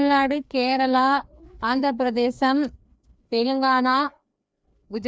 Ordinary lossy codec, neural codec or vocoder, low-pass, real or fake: none; codec, 16 kHz, 2 kbps, FreqCodec, larger model; none; fake